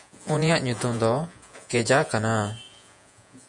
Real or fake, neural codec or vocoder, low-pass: fake; vocoder, 48 kHz, 128 mel bands, Vocos; 10.8 kHz